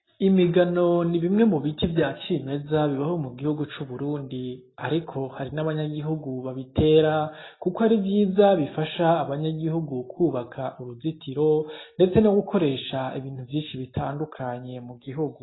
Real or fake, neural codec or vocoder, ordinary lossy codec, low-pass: real; none; AAC, 16 kbps; 7.2 kHz